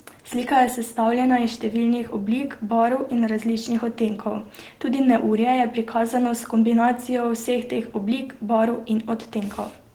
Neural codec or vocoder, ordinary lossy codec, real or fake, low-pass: none; Opus, 16 kbps; real; 19.8 kHz